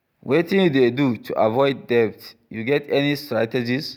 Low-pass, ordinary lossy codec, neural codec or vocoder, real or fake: 19.8 kHz; none; none; real